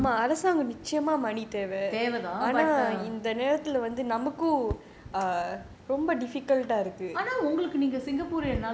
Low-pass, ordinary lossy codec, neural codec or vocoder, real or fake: none; none; none; real